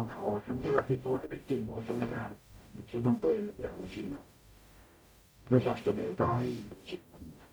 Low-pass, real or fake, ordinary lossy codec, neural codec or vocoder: none; fake; none; codec, 44.1 kHz, 0.9 kbps, DAC